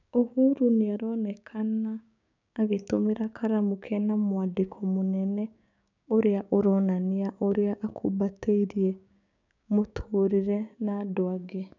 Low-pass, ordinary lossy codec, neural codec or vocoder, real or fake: 7.2 kHz; none; codec, 16 kHz, 6 kbps, DAC; fake